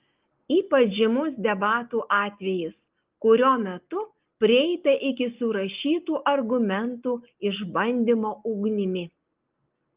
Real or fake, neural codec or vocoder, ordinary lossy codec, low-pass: real; none; Opus, 24 kbps; 3.6 kHz